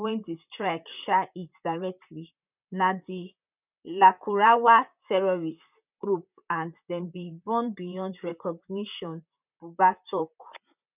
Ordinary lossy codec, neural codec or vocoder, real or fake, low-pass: none; vocoder, 44.1 kHz, 128 mel bands, Pupu-Vocoder; fake; 3.6 kHz